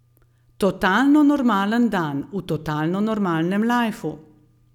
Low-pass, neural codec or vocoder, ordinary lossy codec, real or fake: 19.8 kHz; none; none; real